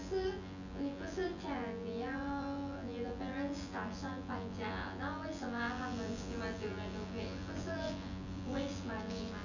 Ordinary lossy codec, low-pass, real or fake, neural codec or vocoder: none; 7.2 kHz; fake; vocoder, 24 kHz, 100 mel bands, Vocos